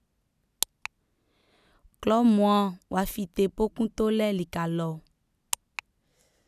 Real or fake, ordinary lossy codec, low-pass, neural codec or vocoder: real; none; 14.4 kHz; none